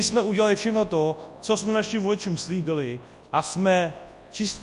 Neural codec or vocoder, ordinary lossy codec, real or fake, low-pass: codec, 24 kHz, 0.9 kbps, WavTokenizer, large speech release; MP3, 64 kbps; fake; 10.8 kHz